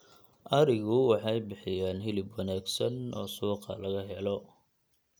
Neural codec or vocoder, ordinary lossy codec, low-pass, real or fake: none; none; none; real